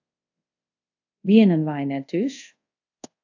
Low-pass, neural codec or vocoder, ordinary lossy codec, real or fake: 7.2 kHz; codec, 24 kHz, 0.5 kbps, DualCodec; AAC, 48 kbps; fake